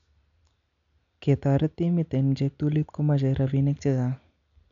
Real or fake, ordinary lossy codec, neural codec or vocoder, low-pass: real; none; none; 7.2 kHz